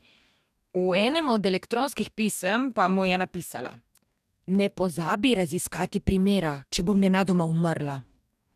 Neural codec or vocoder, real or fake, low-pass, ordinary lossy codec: codec, 44.1 kHz, 2.6 kbps, DAC; fake; 14.4 kHz; none